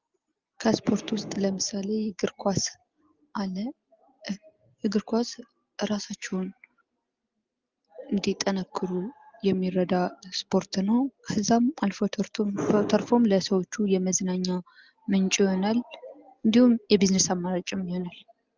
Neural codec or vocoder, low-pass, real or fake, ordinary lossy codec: none; 7.2 kHz; real; Opus, 32 kbps